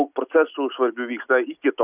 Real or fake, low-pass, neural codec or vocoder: real; 3.6 kHz; none